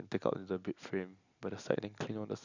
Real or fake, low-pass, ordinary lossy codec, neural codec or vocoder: real; 7.2 kHz; none; none